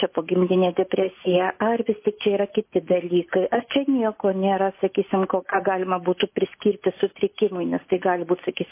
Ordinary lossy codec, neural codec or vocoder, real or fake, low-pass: MP3, 24 kbps; none; real; 3.6 kHz